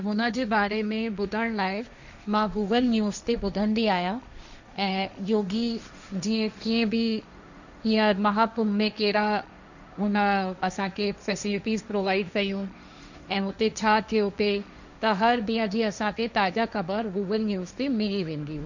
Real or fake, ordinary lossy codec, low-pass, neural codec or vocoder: fake; none; 7.2 kHz; codec, 16 kHz, 1.1 kbps, Voila-Tokenizer